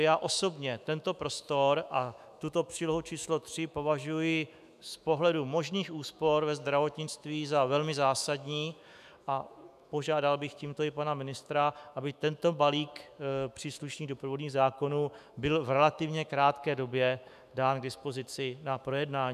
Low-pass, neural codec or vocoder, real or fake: 14.4 kHz; autoencoder, 48 kHz, 128 numbers a frame, DAC-VAE, trained on Japanese speech; fake